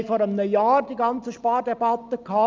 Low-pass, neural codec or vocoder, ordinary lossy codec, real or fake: 7.2 kHz; none; Opus, 24 kbps; real